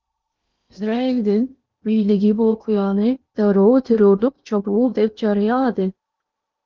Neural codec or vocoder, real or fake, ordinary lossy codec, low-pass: codec, 16 kHz in and 24 kHz out, 0.8 kbps, FocalCodec, streaming, 65536 codes; fake; Opus, 24 kbps; 7.2 kHz